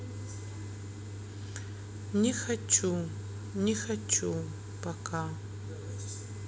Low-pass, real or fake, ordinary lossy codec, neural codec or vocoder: none; real; none; none